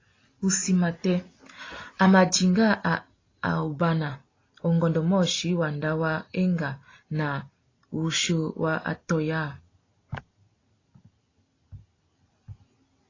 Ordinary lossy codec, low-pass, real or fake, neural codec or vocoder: AAC, 32 kbps; 7.2 kHz; real; none